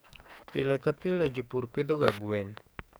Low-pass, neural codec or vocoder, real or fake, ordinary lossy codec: none; codec, 44.1 kHz, 2.6 kbps, SNAC; fake; none